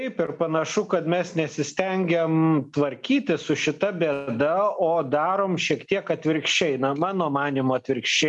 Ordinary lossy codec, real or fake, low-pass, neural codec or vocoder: MP3, 96 kbps; real; 10.8 kHz; none